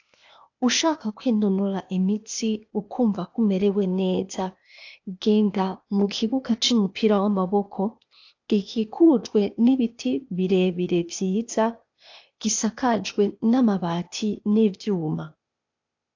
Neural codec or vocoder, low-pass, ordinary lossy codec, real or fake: codec, 16 kHz, 0.8 kbps, ZipCodec; 7.2 kHz; AAC, 48 kbps; fake